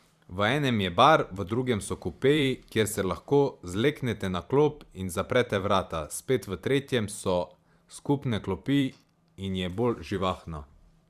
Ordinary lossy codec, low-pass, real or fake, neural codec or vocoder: Opus, 64 kbps; 14.4 kHz; fake; vocoder, 44.1 kHz, 128 mel bands every 512 samples, BigVGAN v2